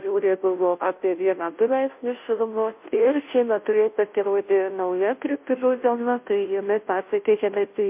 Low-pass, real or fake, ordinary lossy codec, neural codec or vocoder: 3.6 kHz; fake; MP3, 32 kbps; codec, 16 kHz, 0.5 kbps, FunCodec, trained on Chinese and English, 25 frames a second